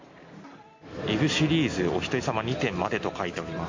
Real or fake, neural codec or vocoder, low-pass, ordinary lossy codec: real; none; 7.2 kHz; MP3, 48 kbps